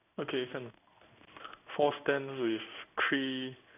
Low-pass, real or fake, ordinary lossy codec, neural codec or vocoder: 3.6 kHz; fake; none; codec, 16 kHz in and 24 kHz out, 1 kbps, XY-Tokenizer